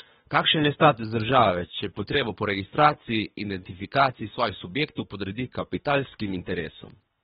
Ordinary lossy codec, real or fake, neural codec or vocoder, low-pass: AAC, 16 kbps; fake; codec, 24 kHz, 3 kbps, HILCodec; 10.8 kHz